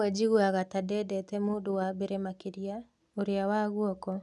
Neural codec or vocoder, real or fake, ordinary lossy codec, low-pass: none; real; none; none